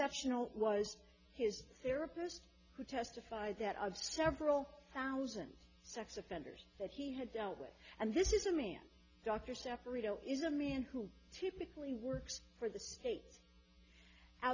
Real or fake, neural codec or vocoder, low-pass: real; none; 7.2 kHz